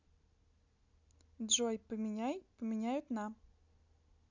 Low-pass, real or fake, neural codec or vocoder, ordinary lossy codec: 7.2 kHz; real; none; none